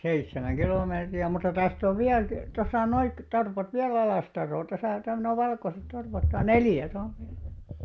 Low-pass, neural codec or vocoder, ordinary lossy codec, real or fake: none; none; none; real